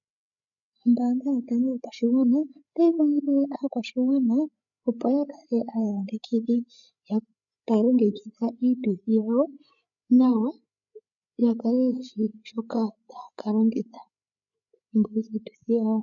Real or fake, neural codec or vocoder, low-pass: fake; codec, 16 kHz, 8 kbps, FreqCodec, larger model; 7.2 kHz